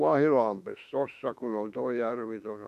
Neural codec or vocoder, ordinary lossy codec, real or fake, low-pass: autoencoder, 48 kHz, 32 numbers a frame, DAC-VAE, trained on Japanese speech; none; fake; 14.4 kHz